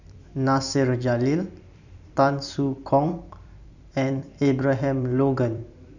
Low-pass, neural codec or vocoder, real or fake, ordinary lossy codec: 7.2 kHz; vocoder, 44.1 kHz, 128 mel bands every 256 samples, BigVGAN v2; fake; none